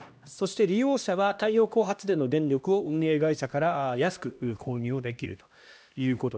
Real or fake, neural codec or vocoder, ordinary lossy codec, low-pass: fake; codec, 16 kHz, 1 kbps, X-Codec, HuBERT features, trained on LibriSpeech; none; none